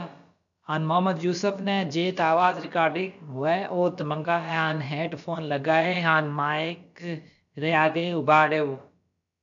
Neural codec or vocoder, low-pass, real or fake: codec, 16 kHz, about 1 kbps, DyCAST, with the encoder's durations; 7.2 kHz; fake